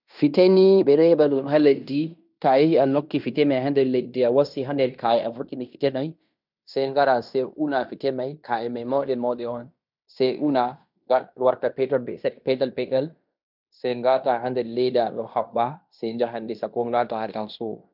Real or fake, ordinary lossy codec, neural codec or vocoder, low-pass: fake; none; codec, 16 kHz in and 24 kHz out, 0.9 kbps, LongCat-Audio-Codec, fine tuned four codebook decoder; 5.4 kHz